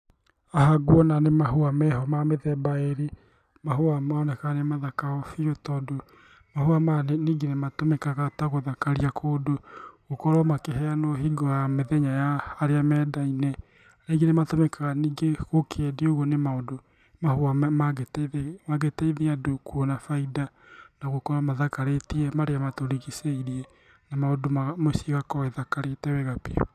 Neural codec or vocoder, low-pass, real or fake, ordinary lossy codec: none; 14.4 kHz; real; none